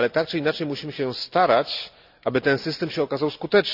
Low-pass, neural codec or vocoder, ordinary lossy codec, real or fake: 5.4 kHz; none; none; real